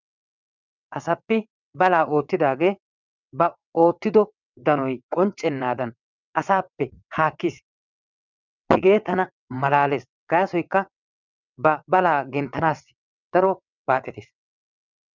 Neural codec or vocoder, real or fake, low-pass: vocoder, 22.05 kHz, 80 mel bands, WaveNeXt; fake; 7.2 kHz